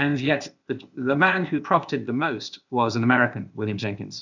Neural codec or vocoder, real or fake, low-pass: codec, 16 kHz, 0.8 kbps, ZipCodec; fake; 7.2 kHz